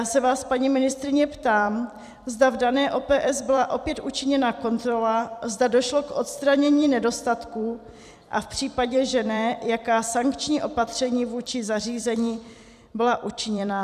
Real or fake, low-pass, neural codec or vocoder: fake; 14.4 kHz; vocoder, 44.1 kHz, 128 mel bands every 256 samples, BigVGAN v2